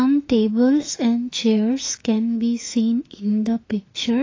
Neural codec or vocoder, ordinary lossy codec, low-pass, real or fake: autoencoder, 48 kHz, 32 numbers a frame, DAC-VAE, trained on Japanese speech; AAC, 32 kbps; 7.2 kHz; fake